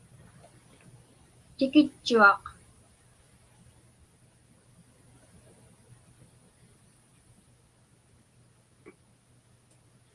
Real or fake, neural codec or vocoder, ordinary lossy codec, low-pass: real; none; Opus, 24 kbps; 10.8 kHz